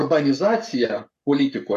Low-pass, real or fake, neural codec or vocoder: 14.4 kHz; fake; codec, 44.1 kHz, 7.8 kbps, Pupu-Codec